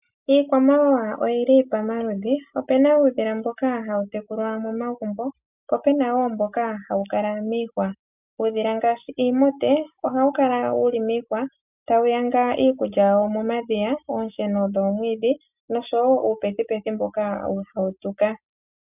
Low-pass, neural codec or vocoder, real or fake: 3.6 kHz; none; real